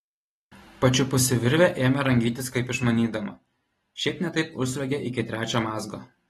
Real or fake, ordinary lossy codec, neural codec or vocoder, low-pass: real; AAC, 32 kbps; none; 19.8 kHz